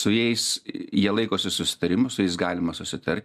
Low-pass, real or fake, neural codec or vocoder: 14.4 kHz; real; none